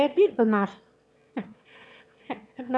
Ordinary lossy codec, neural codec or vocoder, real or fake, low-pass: none; autoencoder, 22.05 kHz, a latent of 192 numbers a frame, VITS, trained on one speaker; fake; none